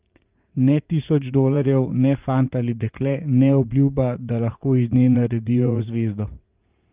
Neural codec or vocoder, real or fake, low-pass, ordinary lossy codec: vocoder, 44.1 kHz, 80 mel bands, Vocos; fake; 3.6 kHz; Opus, 32 kbps